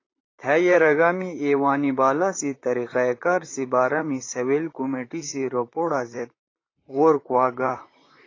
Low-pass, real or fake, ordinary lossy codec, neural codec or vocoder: 7.2 kHz; fake; AAC, 32 kbps; vocoder, 44.1 kHz, 128 mel bands, Pupu-Vocoder